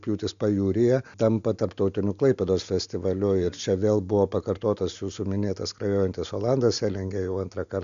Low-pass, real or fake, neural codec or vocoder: 7.2 kHz; real; none